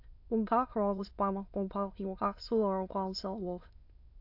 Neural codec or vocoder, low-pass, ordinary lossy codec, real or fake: autoencoder, 22.05 kHz, a latent of 192 numbers a frame, VITS, trained on many speakers; 5.4 kHz; AAC, 32 kbps; fake